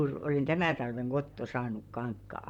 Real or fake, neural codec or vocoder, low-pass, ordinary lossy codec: fake; vocoder, 44.1 kHz, 128 mel bands, Pupu-Vocoder; 19.8 kHz; none